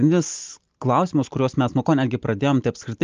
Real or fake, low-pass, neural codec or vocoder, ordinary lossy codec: real; 7.2 kHz; none; Opus, 24 kbps